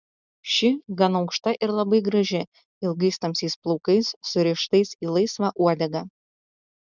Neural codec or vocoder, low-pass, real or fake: none; 7.2 kHz; real